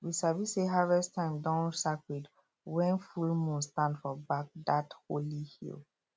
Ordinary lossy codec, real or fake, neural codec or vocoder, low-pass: none; real; none; none